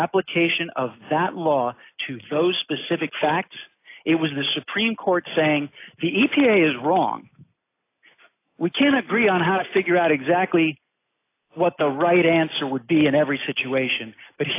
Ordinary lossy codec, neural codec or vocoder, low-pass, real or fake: AAC, 24 kbps; none; 3.6 kHz; real